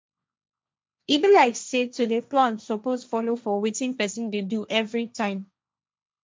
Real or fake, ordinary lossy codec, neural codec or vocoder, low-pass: fake; none; codec, 16 kHz, 1.1 kbps, Voila-Tokenizer; none